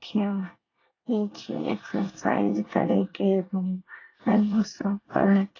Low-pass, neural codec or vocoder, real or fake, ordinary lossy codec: 7.2 kHz; codec, 24 kHz, 1 kbps, SNAC; fake; AAC, 32 kbps